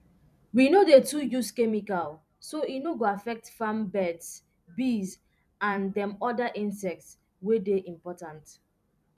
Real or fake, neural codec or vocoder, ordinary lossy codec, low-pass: fake; vocoder, 44.1 kHz, 128 mel bands every 256 samples, BigVGAN v2; none; 14.4 kHz